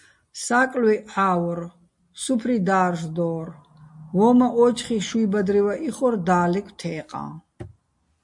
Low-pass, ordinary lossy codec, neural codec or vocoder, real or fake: 10.8 kHz; MP3, 48 kbps; none; real